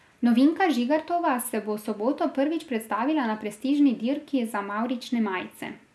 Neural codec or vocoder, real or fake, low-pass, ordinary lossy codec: none; real; none; none